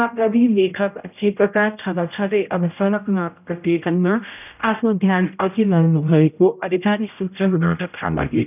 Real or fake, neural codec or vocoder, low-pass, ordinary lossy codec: fake; codec, 16 kHz, 0.5 kbps, X-Codec, HuBERT features, trained on general audio; 3.6 kHz; none